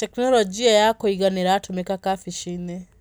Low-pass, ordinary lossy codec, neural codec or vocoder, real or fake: none; none; none; real